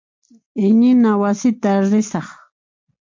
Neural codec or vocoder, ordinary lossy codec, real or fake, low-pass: none; MP3, 48 kbps; real; 7.2 kHz